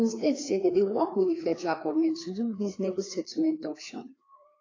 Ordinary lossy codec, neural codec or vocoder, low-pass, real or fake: AAC, 32 kbps; codec, 16 kHz, 2 kbps, FreqCodec, larger model; 7.2 kHz; fake